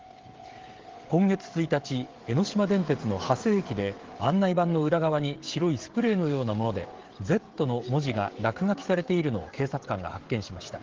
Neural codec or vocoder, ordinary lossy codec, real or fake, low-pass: codec, 16 kHz, 16 kbps, FreqCodec, smaller model; Opus, 16 kbps; fake; 7.2 kHz